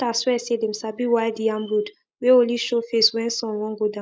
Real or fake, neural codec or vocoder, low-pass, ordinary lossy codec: real; none; none; none